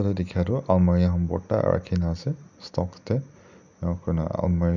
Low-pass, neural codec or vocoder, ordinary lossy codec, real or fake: 7.2 kHz; none; none; real